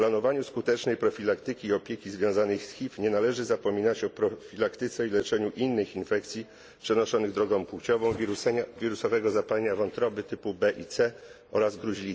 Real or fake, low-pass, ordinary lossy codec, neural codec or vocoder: real; none; none; none